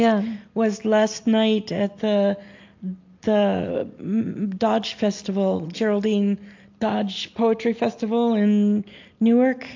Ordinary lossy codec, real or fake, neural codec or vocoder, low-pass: AAC, 48 kbps; real; none; 7.2 kHz